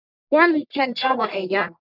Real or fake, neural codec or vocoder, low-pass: fake; codec, 44.1 kHz, 1.7 kbps, Pupu-Codec; 5.4 kHz